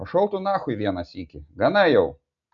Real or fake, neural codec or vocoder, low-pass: real; none; 7.2 kHz